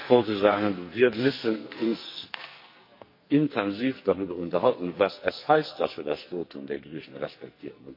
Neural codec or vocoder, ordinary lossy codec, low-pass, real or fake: codec, 44.1 kHz, 2.6 kbps, SNAC; MP3, 32 kbps; 5.4 kHz; fake